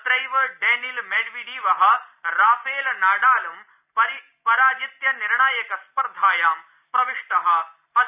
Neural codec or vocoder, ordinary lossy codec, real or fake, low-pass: none; none; real; 3.6 kHz